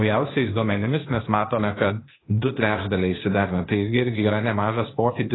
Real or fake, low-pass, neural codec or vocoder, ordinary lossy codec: fake; 7.2 kHz; codec, 16 kHz, 0.8 kbps, ZipCodec; AAC, 16 kbps